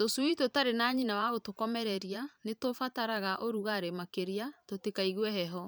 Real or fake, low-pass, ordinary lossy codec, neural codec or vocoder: fake; none; none; vocoder, 44.1 kHz, 128 mel bands every 512 samples, BigVGAN v2